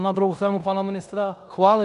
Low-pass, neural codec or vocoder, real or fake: 10.8 kHz; codec, 16 kHz in and 24 kHz out, 0.9 kbps, LongCat-Audio-Codec, fine tuned four codebook decoder; fake